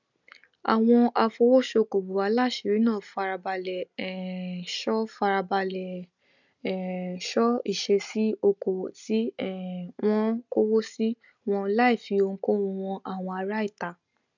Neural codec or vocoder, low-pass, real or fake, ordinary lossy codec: none; 7.2 kHz; real; none